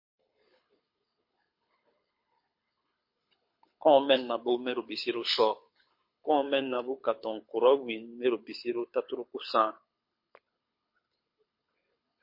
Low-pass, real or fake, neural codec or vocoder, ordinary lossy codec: 5.4 kHz; fake; codec, 24 kHz, 6 kbps, HILCodec; MP3, 32 kbps